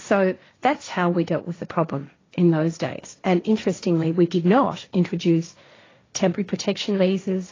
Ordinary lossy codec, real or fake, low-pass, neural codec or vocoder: AAC, 32 kbps; fake; 7.2 kHz; codec, 16 kHz, 1.1 kbps, Voila-Tokenizer